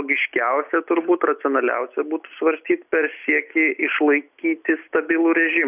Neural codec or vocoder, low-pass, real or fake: none; 3.6 kHz; real